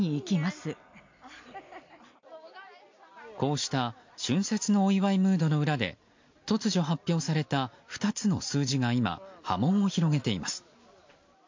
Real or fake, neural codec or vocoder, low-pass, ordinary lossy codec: real; none; 7.2 kHz; MP3, 48 kbps